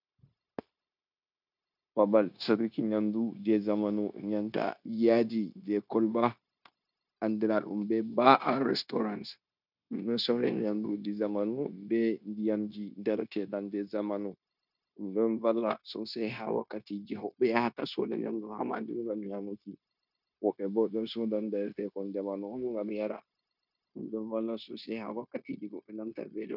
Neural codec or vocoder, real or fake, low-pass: codec, 16 kHz, 0.9 kbps, LongCat-Audio-Codec; fake; 5.4 kHz